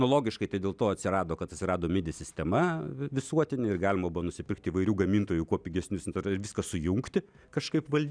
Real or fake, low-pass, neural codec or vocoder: real; 9.9 kHz; none